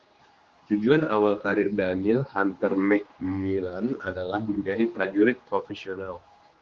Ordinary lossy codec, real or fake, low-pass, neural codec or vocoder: Opus, 32 kbps; fake; 7.2 kHz; codec, 16 kHz, 2 kbps, X-Codec, HuBERT features, trained on general audio